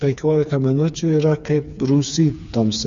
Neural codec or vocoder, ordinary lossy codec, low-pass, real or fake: codec, 16 kHz, 4 kbps, FreqCodec, smaller model; Opus, 64 kbps; 7.2 kHz; fake